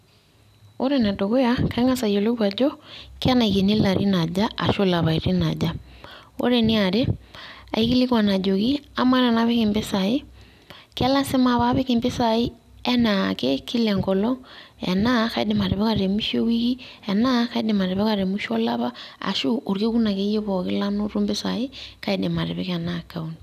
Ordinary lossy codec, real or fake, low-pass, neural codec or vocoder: none; real; 14.4 kHz; none